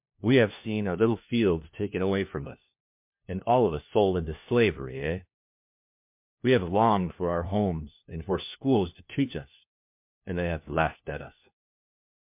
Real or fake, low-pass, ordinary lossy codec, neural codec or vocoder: fake; 3.6 kHz; MP3, 32 kbps; codec, 16 kHz, 1 kbps, FunCodec, trained on LibriTTS, 50 frames a second